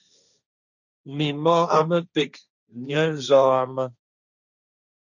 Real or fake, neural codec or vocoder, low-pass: fake; codec, 16 kHz, 1.1 kbps, Voila-Tokenizer; 7.2 kHz